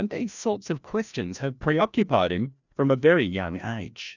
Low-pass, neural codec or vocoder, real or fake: 7.2 kHz; codec, 16 kHz, 1 kbps, FreqCodec, larger model; fake